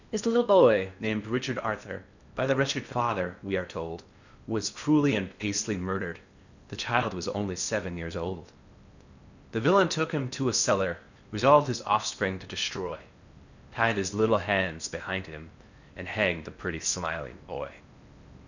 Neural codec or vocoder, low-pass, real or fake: codec, 16 kHz in and 24 kHz out, 0.8 kbps, FocalCodec, streaming, 65536 codes; 7.2 kHz; fake